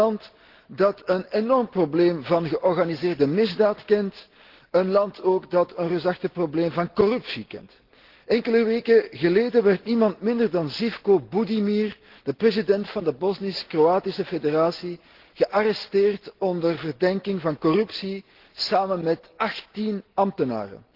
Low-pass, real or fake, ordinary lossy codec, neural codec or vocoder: 5.4 kHz; real; Opus, 16 kbps; none